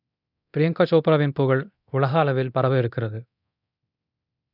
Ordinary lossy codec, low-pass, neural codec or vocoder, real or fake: none; 5.4 kHz; codec, 24 kHz, 0.9 kbps, DualCodec; fake